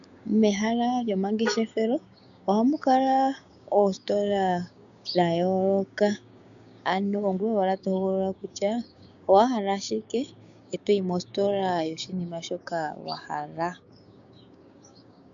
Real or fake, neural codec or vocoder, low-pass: fake; codec, 16 kHz, 6 kbps, DAC; 7.2 kHz